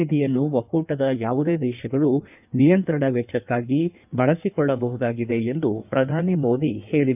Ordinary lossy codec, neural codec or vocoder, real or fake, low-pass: none; codec, 16 kHz in and 24 kHz out, 1.1 kbps, FireRedTTS-2 codec; fake; 3.6 kHz